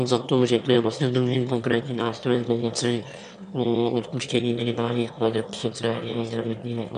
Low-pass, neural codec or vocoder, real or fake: 9.9 kHz; autoencoder, 22.05 kHz, a latent of 192 numbers a frame, VITS, trained on one speaker; fake